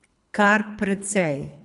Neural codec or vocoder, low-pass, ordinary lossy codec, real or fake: codec, 24 kHz, 3 kbps, HILCodec; 10.8 kHz; none; fake